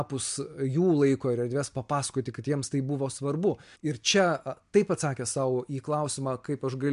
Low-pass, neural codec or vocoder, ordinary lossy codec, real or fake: 10.8 kHz; none; MP3, 64 kbps; real